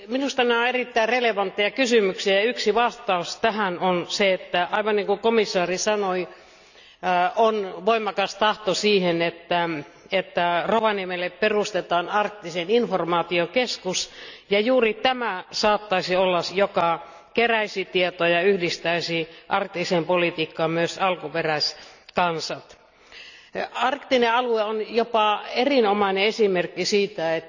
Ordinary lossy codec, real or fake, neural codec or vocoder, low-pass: none; real; none; 7.2 kHz